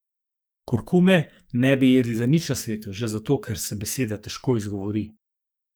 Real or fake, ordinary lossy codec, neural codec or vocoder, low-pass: fake; none; codec, 44.1 kHz, 2.6 kbps, SNAC; none